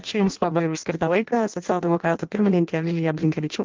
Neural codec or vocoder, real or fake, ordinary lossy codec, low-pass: codec, 16 kHz in and 24 kHz out, 0.6 kbps, FireRedTTS-2 codec; fake; Opus, 32 kbps; 7.2 kHz